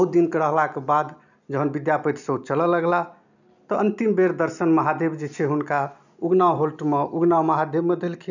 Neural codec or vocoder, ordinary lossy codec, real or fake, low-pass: none; none; real; 7.2 kHz